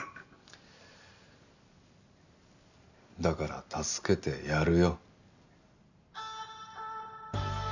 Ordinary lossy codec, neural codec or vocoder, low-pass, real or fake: none; none; 7.2 kHz; real